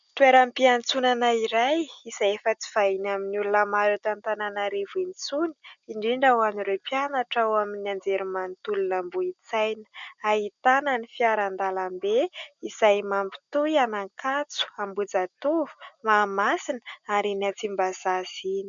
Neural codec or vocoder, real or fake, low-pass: none; real; 7.2 kHz